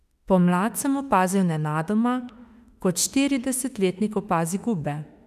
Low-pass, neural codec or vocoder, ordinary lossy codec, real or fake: 14.4 kHz; autoencoder, 48 kHz, 32 numbers a frame, DAC-VAE, trained on Japanese speech; none; fake